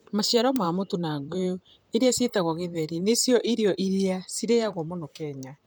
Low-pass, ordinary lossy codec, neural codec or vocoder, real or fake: none; none; vocoder, 44.1 kHz, 128 mel bands, Pupu-Vocoder; fake